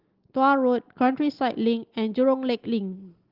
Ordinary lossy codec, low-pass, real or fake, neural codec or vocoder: Opus, 16 kbps; 5.4 kHz; real; none